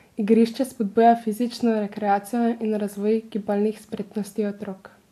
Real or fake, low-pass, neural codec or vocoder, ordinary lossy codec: real; 14.4 kHz; none; AAC, 64 kbps